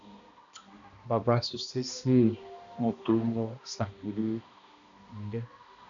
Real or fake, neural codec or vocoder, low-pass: fake; codec, 16 kHz, 1 kbps, X-Codec, HuBERT features, trained on balanced general audio; 7.2 kHz